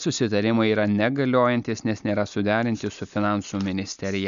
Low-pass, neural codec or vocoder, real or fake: 7.2 kHz; none; real